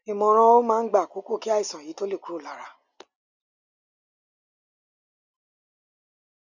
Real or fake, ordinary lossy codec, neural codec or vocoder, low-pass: real; none; none; 7.2 kHz